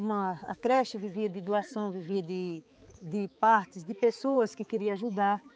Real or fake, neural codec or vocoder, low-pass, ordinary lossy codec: fake; codec, 16 kHz, 4 kbps, X-Codec, HuBERT features, trained on balanced general audio; none; none